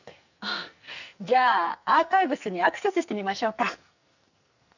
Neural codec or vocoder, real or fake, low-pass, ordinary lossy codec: codec, 44.1 kHz, 2.6 kbps, SNAC; fake; 7.2 kHz; none